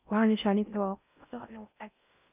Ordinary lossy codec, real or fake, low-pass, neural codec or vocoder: none; fake; 3.6 kHz; codec, 16 kHz in and 24 kHz out, 0.6 kbps, FocalCodec, streaming, 4096 codes